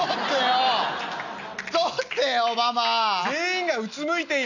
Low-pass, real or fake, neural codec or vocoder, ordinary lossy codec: 7.2 kHz; real; none; none